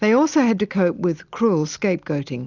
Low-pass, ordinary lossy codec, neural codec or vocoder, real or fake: 7.2 kHz; Opus, 64 kbps; none; real